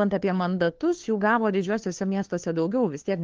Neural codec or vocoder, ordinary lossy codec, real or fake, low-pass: codec, 16 kHz, 2 kbps, FunCodec, trained on LibriTTS, 25 frames a second; Opus, 16 kbps; fake; 7.2 kHz